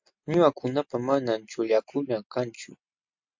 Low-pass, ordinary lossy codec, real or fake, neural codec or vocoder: 7.2 kHz; MP3, 48 kbps; real; none